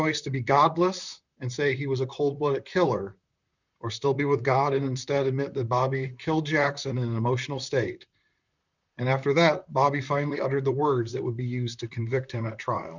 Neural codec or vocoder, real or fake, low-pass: vocoder, 44.1 kHz, 128 mel bands, Pupu-Vocoder; fake; 7.2 kHz